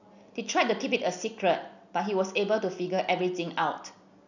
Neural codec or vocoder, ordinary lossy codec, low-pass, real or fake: none; none; 7.2 kHz; real